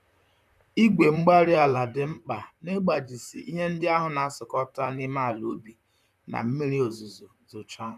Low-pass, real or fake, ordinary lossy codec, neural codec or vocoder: 14.4 kHz; fake; none; vocoder, 44.1 kHz, 128 mel bands, Pupu-Vocoder